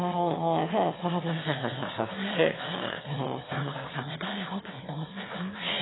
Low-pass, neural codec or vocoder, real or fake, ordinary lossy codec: 7.2 kHz; autoencoder, 22.05 kHz, a latent of 192 numbers a frame, VITS, trained on one speaker; fake; AAC, 16 kbps